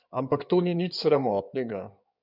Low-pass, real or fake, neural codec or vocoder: 5.4 kHz; fake; codec, 16 kHz in and 24 kHz out, 2.2 kbps, FireRedTTS-2 codec